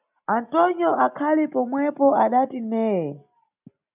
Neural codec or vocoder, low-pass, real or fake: none; 3.6 kHz; real